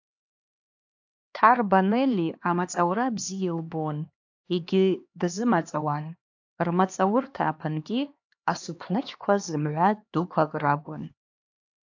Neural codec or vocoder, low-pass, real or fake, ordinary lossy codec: codec, 16 kHz, 2 kbps, X-Codec, HuBERT features, trained on LibriSpeech; 7.2 kHz; fake; AAC, 48 kbps